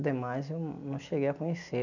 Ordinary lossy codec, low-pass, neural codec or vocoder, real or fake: none; 7.2 kHz; none; real